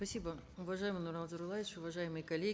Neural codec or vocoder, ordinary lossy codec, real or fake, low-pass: none; none; real; none